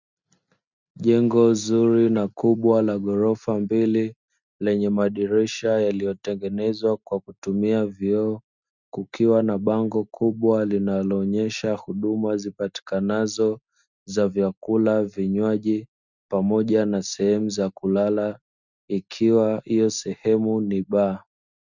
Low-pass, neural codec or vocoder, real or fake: 7.2 kHz; none; real